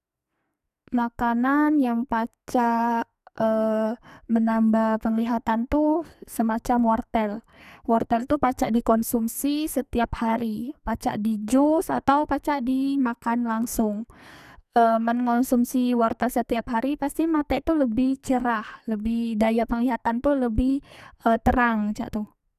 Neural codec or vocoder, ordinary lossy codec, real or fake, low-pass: codec, 44.1 kHz, 2.6 kbps, SNAC; none; fake; 14.4 kHz